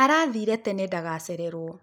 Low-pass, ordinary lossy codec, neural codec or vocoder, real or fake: none; none; none; real